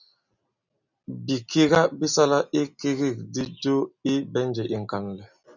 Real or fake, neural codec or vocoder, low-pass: real; none; 7.2 kHz